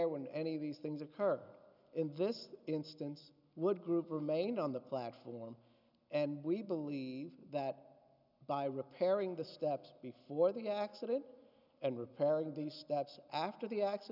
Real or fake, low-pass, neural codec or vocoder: real; 5.4 kHz; none